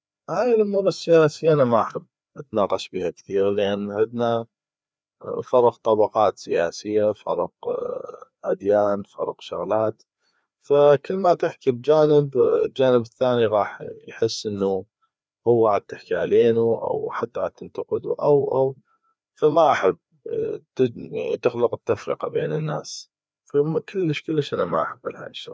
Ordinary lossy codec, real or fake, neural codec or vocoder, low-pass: none; fake; codec, 16 kHz, 2 kbps, FreqCodec, larger model; none